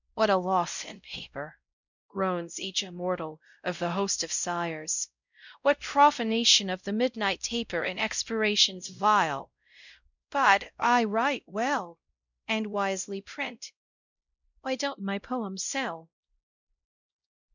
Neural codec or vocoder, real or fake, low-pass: codec, 16 kHz, 0.5 kbps, X-Codec, WavLM features, trained on Multilingual LibriSpeech; fake; 7.2 kHz